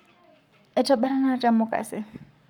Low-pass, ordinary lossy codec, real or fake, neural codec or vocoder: 19.8 kHz; none; fake; codec, 44.1 kHz, 7.8 kbps, Pupu-Codec